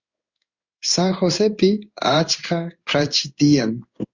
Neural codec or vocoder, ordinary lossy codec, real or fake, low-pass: codec, 16 kHz in and 24 kHz out, 1 kbps, XY-Tokenizer; Opus, 64 kbps; fake; 7.2 kHz